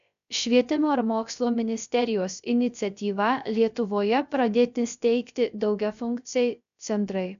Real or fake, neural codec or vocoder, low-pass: fake; codec, 16 kHz, 0.3 kbps, FocalCodec; 7.2 kHz